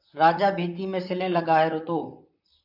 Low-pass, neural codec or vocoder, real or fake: 5.4 kHz; vocoder, 44.1 kHz, 128 mel bands, Pupu-Vocoder; fake